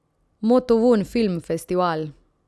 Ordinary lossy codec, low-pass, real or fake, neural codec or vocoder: none; none; real; none